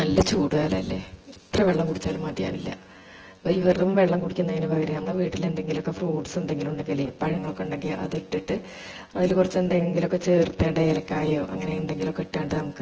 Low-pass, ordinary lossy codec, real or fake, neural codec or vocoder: 7.2 kHz; Opus, 16 kbps; fake; vocoder, 24 kHz, 100 mel bands, Vocos